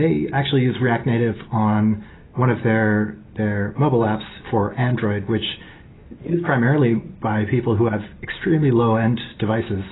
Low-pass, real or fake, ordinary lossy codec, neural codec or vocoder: 7.2 kHz; real; AAC, 16 kbps; none